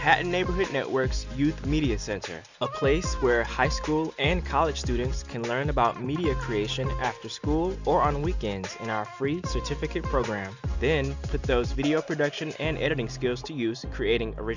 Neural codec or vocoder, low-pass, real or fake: none; 7.2 kHz; real